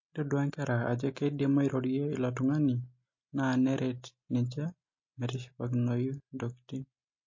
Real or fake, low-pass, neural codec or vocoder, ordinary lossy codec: real; 7.2 kHz; none; MP3, 32 kbps